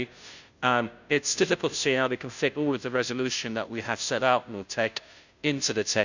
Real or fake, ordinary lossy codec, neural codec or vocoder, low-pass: fake; none; codec, 16 kHz, 0.5 kbps, FunCodec, trained on Chinese and English, 25 frames a second; 7.2 kHz